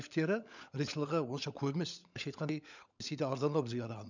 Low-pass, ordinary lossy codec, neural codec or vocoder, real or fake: 7.2 kHz; none; codec, 16 kHz, 16 kbps, FunCodec, trained on LibriTTS, 50 frames a second; fake